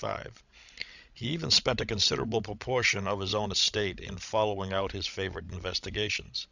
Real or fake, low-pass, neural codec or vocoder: fake; 7.2 kHz; codec, 16 kHz, 16 kbps, FreqCodec, larger model